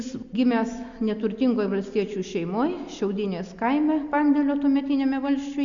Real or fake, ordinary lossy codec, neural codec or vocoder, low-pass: real; Opus, 64 kbps; none; 7.2 kHz